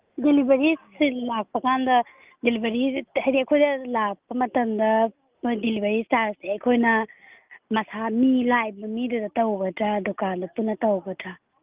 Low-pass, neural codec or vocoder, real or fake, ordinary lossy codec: 3.6 kHz; none; real; Opus, 24 kbps